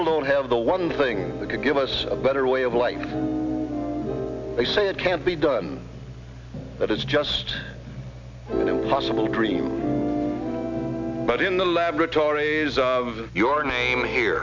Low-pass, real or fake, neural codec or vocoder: 7.2 kHz; real; none